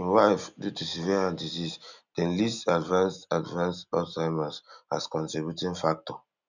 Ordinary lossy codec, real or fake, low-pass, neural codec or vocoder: none; real; 7.2 kHz; none